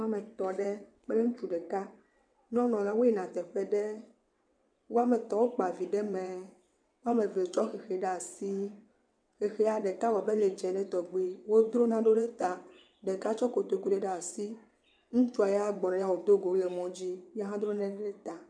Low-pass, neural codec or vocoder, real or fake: 9.9 kHz; vocoder, 22.05 kHz, 80 mel bands, WaveNeXt; fake